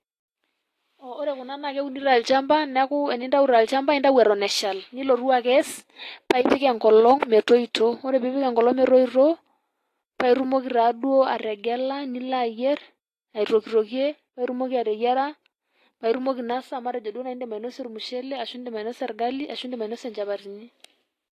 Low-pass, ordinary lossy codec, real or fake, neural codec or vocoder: 14.4 kHz; AAC, 48 kbps; real; none